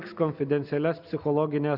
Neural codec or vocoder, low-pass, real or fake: none; 5.4 kHz; real